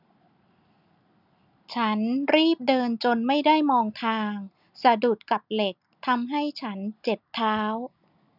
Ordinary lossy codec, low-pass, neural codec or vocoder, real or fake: none; 5.4 kHz; none; real